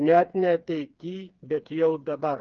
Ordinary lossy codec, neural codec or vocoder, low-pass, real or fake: Opus, 24 kbps; codec, 16 kHz, 4 kbps, FreqCodec, smaller model; 7.2 kHz; fake